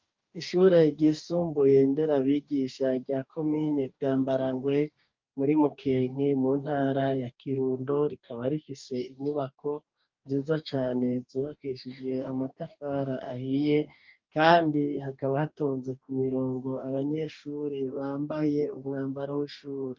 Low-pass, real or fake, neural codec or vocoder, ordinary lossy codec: 7.2 kHz; fake; codec, 44.1 kHz, 2.6 kbps, DAC; Opus, 24 kbps